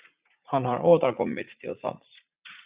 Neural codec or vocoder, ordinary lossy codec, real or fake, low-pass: vocoder, 44.1 kHz, 80 mel bands, Vocos; Opus, 64 kbps; fake; 3.6 kHz